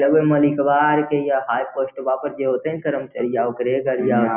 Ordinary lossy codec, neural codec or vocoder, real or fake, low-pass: Opus, 64 kbps; none; real; 3.6 kHz